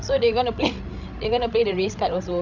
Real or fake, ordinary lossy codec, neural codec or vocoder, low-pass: fake; none; codec, 16 kHz, 16 kbps, FreqCodec, larger model; 7.2 kHz